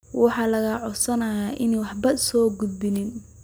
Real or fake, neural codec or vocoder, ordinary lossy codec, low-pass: real; none; none; none